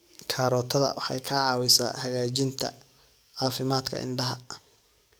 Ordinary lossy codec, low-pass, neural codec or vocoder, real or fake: none; none; codec, 44.1 kHz, 7.8 kbps, DAC; fake